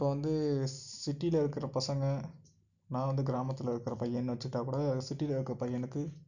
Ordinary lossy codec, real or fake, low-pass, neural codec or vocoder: none; real; 7.2 kHz; none